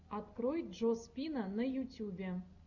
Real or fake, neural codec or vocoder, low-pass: real; none; 7.2 kHz